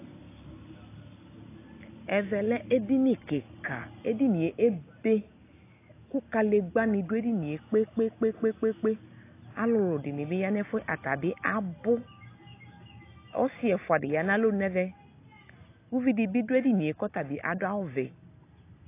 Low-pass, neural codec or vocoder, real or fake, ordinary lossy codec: 3.6 kHz; none; real; AAC, 24 kbps